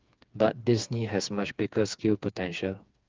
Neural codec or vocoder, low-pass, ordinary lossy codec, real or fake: codec, 16 kHz, 4 kbps, FreqCodec, smaller model; 7.2 kHz; Opus, 24 kbps; fake